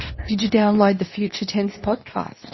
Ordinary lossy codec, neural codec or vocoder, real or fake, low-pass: MP3, 24 kbps; codec, 24 kHz, 0.9 kbps, WavTokenizer, medium speech release version 2; fake; 7.2 kHz